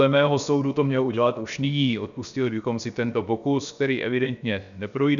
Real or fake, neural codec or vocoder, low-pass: fake; codec, 16 kHz, about 1 kbps, DyCAST, with the encoder's durations; 7.2 kHz